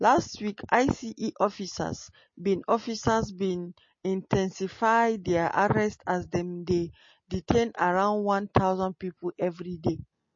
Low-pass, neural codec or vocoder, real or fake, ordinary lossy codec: 7.2 kHz; none; real; MP3, 32 kbps